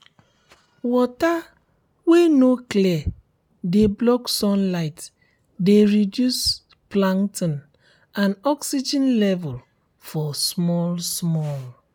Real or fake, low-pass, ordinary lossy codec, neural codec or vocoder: real; 19.8 kHz; none; none